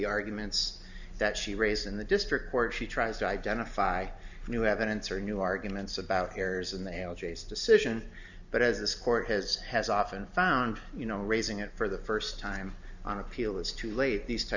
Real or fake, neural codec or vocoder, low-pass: real; none; 7.2 kHz